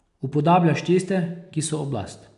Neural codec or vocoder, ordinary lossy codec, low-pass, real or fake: none; none; 10.8 kHz; real